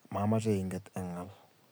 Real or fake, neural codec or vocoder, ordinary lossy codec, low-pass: real; none; none; none